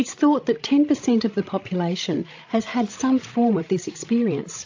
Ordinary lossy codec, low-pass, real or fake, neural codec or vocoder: AAC, 48 kbps; 7.2 kHz; fake; codec, 16 kHz, 16 kbps, FreqCodec, larger model